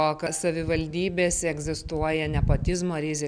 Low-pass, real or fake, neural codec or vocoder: 9.9 kHz; real; none